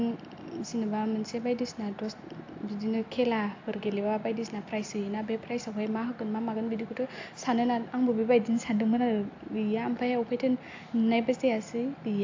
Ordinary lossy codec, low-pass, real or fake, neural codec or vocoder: AAC, 48 kbps; 7.2 kHz; real; none